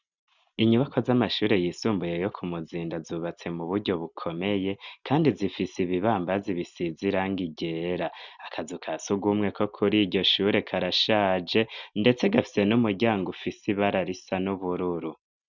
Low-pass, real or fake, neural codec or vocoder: 7.2 kHz; real; none